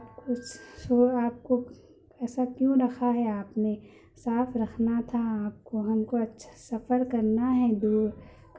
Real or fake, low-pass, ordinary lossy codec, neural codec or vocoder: real; none; none; none